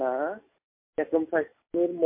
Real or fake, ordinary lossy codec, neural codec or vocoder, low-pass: real; MP3, 24 kbps; none; 3.6 kHz